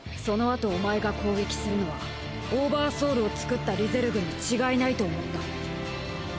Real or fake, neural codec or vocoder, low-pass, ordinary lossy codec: real; none; none; none